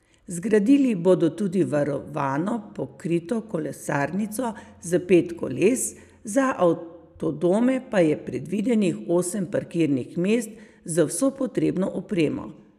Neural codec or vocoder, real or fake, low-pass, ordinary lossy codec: none; real; 14.4 kHz; none